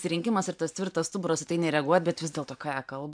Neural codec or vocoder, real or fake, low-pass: vocoder, 44.1 kHz, 128 mel bands every 512 samples, BigVGAN v2; fake; 9.9 kHz